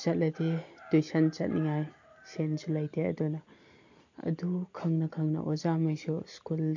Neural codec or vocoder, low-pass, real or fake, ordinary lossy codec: none; 7.2 kHz; real; MP3, 48 kbps